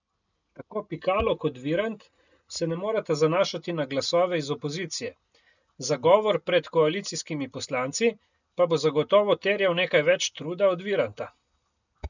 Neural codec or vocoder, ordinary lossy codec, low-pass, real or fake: none; none; 7.2 kHz; real